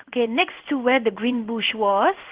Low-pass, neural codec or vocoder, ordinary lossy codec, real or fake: 3.6 kHz; codec, 16 kHz in and 24 kHz out, 1 kbps, XY-Tokenizer; Opus, 16 kbps; fake